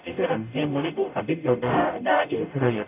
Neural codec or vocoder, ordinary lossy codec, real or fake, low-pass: codec, 44.1 kHz, 0.9 kbps, DAC; none; fake; 3.6 kHz